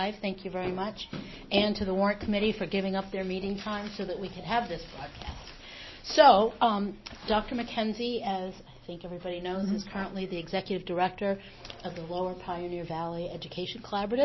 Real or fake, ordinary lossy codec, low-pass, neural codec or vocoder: real; MP3, 24 kbps; 7.2 kHz; none